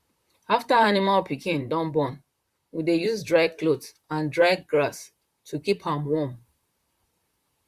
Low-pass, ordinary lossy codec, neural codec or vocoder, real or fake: 14.4 kHz; Opus, 64 kbps; vocoder, 44.1 kHz, 128 mel bands, Pupu-Vocoder; fake